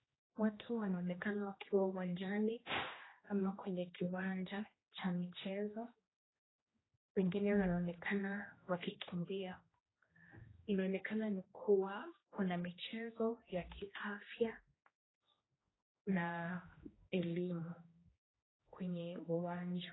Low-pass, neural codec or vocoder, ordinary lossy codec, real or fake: 7.2 kHz; codec, 16 kHz, 1 kbps, X-Codec, HuBERT features, trained on general audio; AAC, 16 kbps; fake